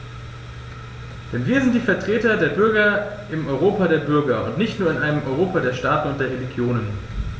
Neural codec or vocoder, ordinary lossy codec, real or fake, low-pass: none; none; real; none